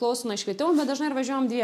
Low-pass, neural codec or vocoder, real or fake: 14.4 kHz; none; real